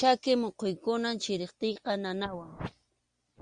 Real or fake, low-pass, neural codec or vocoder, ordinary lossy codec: real; 9.9 kHz; none; Opus, 64 kbps